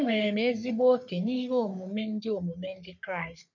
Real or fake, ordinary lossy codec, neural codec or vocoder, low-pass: fake; none; codec, 44.1 kHz, 3.4 kbps, Pupu-Codec; 7.2 kHz